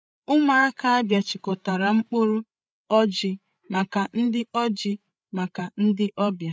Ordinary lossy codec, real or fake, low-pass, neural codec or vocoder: none; fake; none; codec, 16 kHz, 8 kbps, FreqCodec, larger model